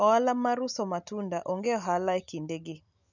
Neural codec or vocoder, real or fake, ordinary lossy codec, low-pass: none; real; none; 7.2 kHz